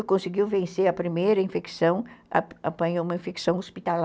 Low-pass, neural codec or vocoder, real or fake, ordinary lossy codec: none; none; real; none